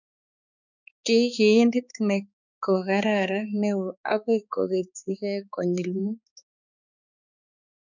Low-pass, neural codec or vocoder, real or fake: 7.2 kHz; codec, 16 kHz, 4 kbps, X-Codec, HuBERT features, trained on balanced general audio; fake